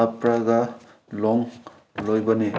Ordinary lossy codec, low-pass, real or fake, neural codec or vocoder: none; none; real; none